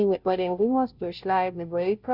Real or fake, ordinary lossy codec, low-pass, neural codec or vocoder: fake; Opus, 64 kbps; 5.4 kHz; codec, 16 kHz, 0.5 kbps, FunCodec, trained on Chinese and English, 25 frames a second